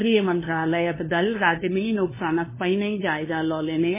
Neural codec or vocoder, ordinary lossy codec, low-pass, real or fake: codec, 16 kHz, 2 kbps, FunCodec, trained on LibriTTS, 25 frames a second; MP3, 16 kbps; 3.6 kHz; fake